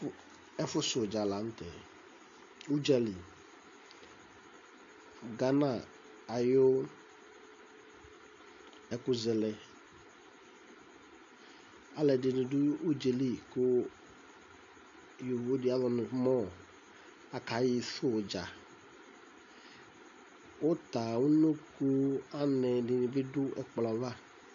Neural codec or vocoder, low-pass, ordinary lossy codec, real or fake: none; 7.2 kHz; MP3, 48 kbps; real